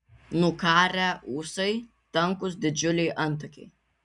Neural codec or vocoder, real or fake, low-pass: none; real; 10.8 kHz